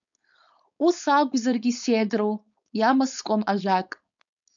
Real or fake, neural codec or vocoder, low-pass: fake; codec, 16 kHz, 4.8 kbps, FACodec; 7.2 kHz